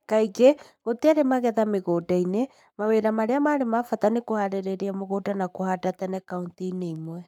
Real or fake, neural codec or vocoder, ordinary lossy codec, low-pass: fake; codec, 44.1 kHz, 7.8 kbps, Pupu-Codec; none; 19.8 kHz